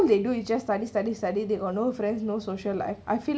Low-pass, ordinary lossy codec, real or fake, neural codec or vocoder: none; none; real; none